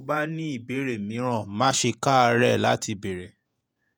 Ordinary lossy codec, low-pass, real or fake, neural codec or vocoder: none; none; fake; vocoder, 48 kHz, 128 mel bands, Vocos